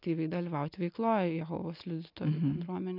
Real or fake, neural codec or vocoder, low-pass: real; none; 5.4 kHz